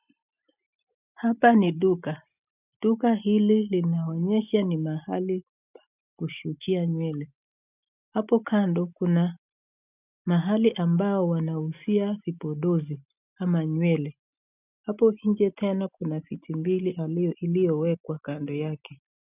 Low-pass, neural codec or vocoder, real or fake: 3.6 kHz; none; real